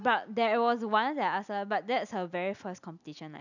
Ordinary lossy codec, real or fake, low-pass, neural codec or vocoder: none; real; 7.2 kHz; none